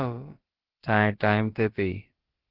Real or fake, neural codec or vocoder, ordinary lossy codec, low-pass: fake; codec, 16 kHz, about 1 kbps, DyCAST, with the encoder's durations; Opus, 16 kbps; 5.4 kHz